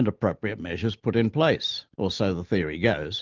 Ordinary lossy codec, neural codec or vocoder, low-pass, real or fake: Opus, 32 kbps; none; 7.2 kHz; real